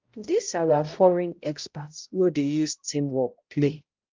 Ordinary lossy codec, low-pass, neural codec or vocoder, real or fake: Opus, 32 kbps; 7.2 kHz; codec, 16 kHz, 0.5 kbps, X-Codec, HuBERT features, trained on balanced general audio; fake